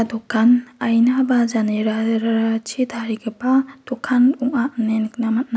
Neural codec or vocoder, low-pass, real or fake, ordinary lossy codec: none; none; real; none